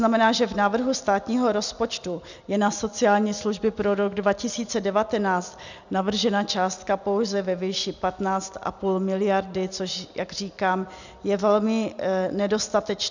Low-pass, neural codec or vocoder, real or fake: 7.2 kHz; none; real